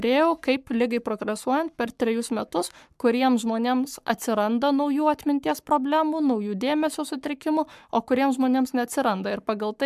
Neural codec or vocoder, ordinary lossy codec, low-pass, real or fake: codec, 44.1 kHz, 7.8 kbps, Pupu-Codec; MP3, 96 kbps; 14.4 kHz; fake